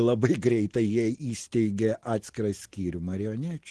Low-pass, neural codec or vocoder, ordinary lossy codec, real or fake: 10.8 kHz; none; Opus, 16 kbps; real